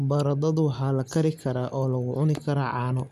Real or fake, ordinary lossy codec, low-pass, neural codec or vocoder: real; none; 14.4 kHz; none